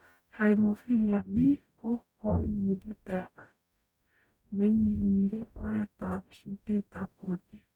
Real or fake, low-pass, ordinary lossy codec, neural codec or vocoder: fake; 19.8 kHz; none; codec, 44.1 kHz, 0.9 kbps, DAC